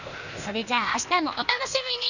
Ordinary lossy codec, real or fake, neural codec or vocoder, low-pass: none; fake; codec, 16 kHz, 0.8 kbps, ZipCodec; 7.2 kHz